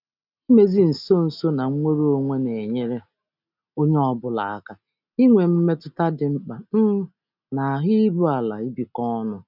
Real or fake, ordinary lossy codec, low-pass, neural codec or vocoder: real; none; 5.4 kHz; none